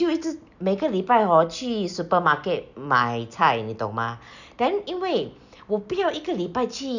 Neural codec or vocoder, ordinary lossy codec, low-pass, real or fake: none; none; 7.2 kHz; real